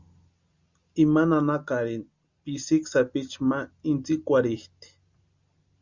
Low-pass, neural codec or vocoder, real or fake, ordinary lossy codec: 7.2 kHz; none; real; Opus, 64 kbps